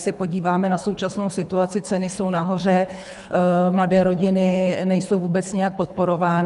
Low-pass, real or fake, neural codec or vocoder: 10.8 kHz; fake; codec, 24 kHz, 3 kbps, HILCodec